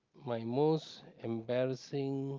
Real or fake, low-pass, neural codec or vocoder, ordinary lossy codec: real; 7.2 kHz; none; Opus, 24 kbps